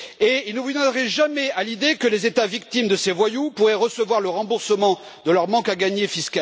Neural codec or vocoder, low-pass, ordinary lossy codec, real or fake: none; none; none; real